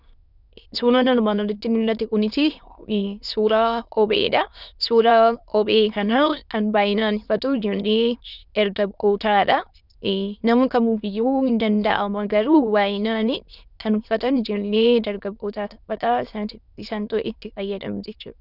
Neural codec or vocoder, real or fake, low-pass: autoencoder, 22.05 kHz, a latent of 192 numbers a frame, VITS, trained on many speakers; fake; 5.4 kHz